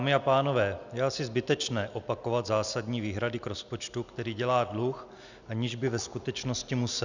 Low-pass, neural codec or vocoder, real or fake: 7.2 kHz; none; real